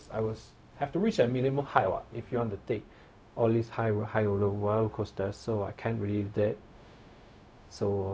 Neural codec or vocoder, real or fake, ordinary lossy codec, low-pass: codec, 16 kHz, 0.4 kbps, LongCat-Audio-Codec; fake; none; none